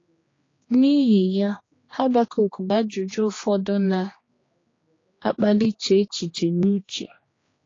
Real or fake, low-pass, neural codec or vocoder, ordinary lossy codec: fake; 7.2 kHz; codec, 16 kHz, 2 kbps, X-Codec, HuBERT features, trained on general audio; AAC, 32 kbps